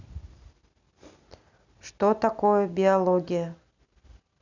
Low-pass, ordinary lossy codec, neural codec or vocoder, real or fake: 7.2 kHz; none; none; real